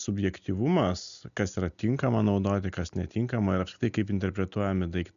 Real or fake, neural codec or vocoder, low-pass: real; none; 7.2 kHz